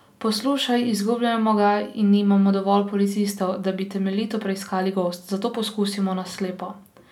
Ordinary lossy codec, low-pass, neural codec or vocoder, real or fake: none; 19.8 kHz; none; real